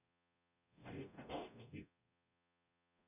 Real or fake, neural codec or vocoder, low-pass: fake; codec, 44.1 kHz, 0.9 kbps, DAC; 3.6 kHz